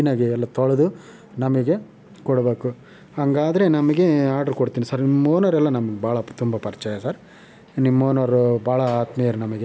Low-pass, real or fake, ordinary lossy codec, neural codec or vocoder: none; real; none; none